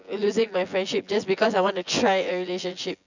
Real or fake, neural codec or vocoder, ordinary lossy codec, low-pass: fake; vocoder, 24 kHz, 100 mel bands, Vocos; none; 7.2 kHz